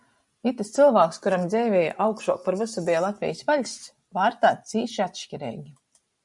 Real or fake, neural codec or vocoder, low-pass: real; none; 10.8 kHz